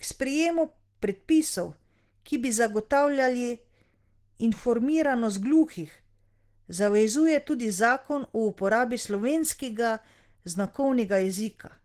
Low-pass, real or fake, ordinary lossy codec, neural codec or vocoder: 14.4 kHz; real; Opus, 16 kbps; none